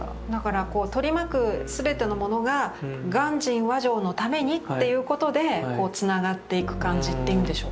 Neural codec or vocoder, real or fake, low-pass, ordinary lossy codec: none; real; none; none